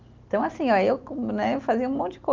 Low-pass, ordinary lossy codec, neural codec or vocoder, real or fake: 7.2 kHz; Opus, 24 kbps; none; real